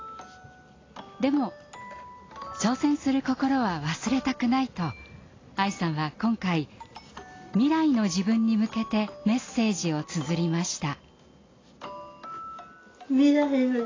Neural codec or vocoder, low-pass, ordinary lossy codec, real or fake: none; 7.2 kHz; AAC, 32 kbps; real